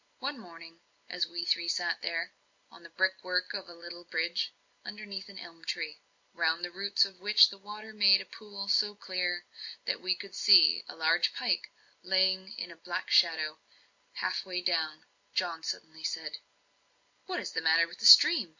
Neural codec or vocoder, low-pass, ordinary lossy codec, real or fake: none; 7.2 kHz; MP3, 48 kbps; real